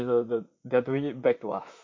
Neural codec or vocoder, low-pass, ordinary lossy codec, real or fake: vocoder, 44.1 kHz, 128 mel bands, Pupu-Vocoder; 7.2 kHz; MP3, 48 kbps; fake